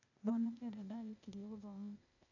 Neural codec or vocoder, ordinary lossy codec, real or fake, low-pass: codec, 16 kHz, 0.8 kbps, ZipCodec; none; fake; 7.2 kHz